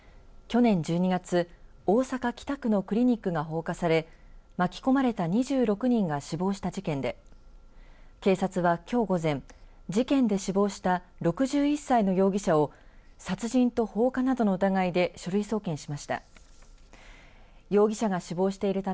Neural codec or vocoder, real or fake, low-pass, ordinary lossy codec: none; real; none; none